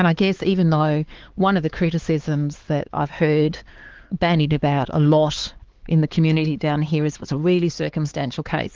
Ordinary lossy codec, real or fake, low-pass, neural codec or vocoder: Opus, 16 kbps; fake; 7.2 kHz; codec, 16 kHz, 4 kbps, X-Codec, HuBERT features, trained on LibriSpeech